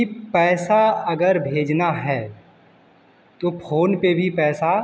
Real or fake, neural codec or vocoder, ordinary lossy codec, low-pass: real; none; none; none